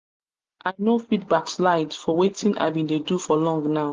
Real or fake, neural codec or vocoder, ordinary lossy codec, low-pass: real; none; Opus, 32 kbps; 7.2 kHz